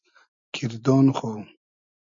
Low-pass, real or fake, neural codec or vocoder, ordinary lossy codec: 7.2 kHz; real; none; MP3, 64 kbps